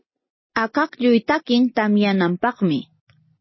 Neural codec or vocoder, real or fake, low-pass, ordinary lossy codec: none; real; 7.2 kHz; MP3, 24 kbps